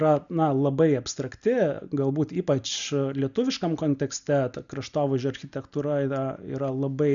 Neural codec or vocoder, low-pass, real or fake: none; 7.2 kHz; real